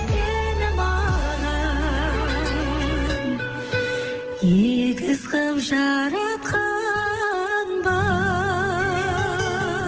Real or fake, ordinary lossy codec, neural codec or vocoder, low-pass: fake; Opus, 16 kbps; autoencoder, 48 kHz, 128 numbers a frame, DAC-VAE, trained on Japanese speech; 7.2 kHz